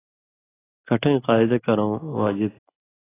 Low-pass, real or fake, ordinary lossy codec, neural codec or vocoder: 3.6 kHz; real; AAC, 16 kbps; none